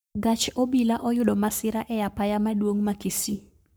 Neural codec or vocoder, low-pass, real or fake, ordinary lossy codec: codec, 44.1 kHz, 7.8 kbps, Pupu-Codec; none; fake; none